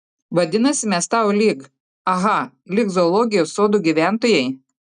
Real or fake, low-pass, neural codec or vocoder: real; 10.8 kHz; none